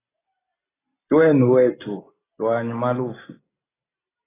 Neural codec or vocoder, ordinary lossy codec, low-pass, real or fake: none; AAC, 16 kbps; 3.6 kHz; real